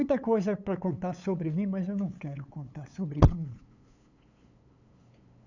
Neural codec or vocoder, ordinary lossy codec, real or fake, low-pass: codec, 16 kHz, 8 kbps, FunCodec, trained on LibriTTS, 25 frames a second; none; fake; 7.2 kHz